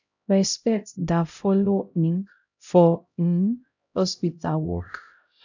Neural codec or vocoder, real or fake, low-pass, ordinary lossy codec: codec, 16 kHz, 0.5 kbps, X-Codec, HuBERT features, trained on LibriSpeech; fake; 7.2 kHz; none